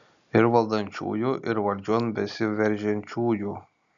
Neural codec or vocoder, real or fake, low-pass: none; real; 7.2 kHz